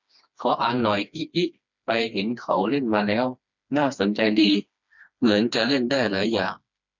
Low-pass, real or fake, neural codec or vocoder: 7.2 kHz; fake; codec, 16 kHz, 2 kbps, FreqCodec, smaller model